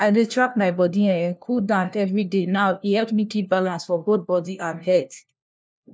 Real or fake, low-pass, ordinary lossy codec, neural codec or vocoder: fake; none; none; codec, 16 kHz, 1 kbps, FunCodec, trained on LibriTTS, 50 frames a second